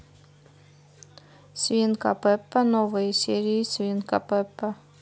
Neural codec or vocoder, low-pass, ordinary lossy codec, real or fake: none; none; none; real